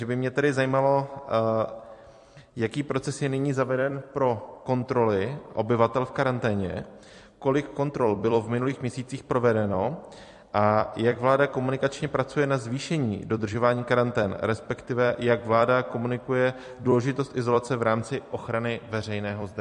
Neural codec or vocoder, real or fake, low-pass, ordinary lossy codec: vocoder, 44.1 kHz, 128 mel bands every 256 samples, BigVGAN v2; fake; 14.4 kHz; MP3, 48 kbps